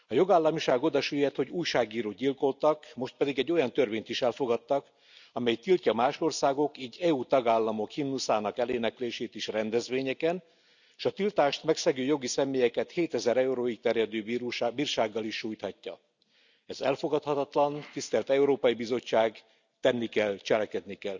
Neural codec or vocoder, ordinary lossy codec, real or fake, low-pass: none; none; real; 7.2 kHz